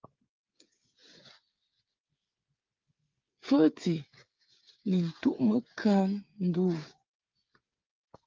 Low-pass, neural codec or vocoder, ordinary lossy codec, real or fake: 7.2 kHz; codec, 16 kHz, 8 kbps, FreqCodec, smaller model; Opus, 24 kbps; fake